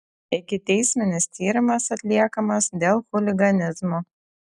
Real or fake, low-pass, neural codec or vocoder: real; 10.8 kHz; none